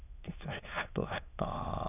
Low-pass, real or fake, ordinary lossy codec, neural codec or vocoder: 3.6 kHz; fake; none; autoencoder, 22.05 kHz, a latent of 192 numbers a frame, VITS, trained on many speakers